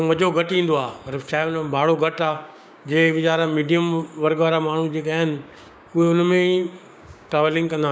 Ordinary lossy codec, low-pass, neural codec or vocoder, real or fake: none; none; codec, 16 kHz, 6 kbps, DAC; fake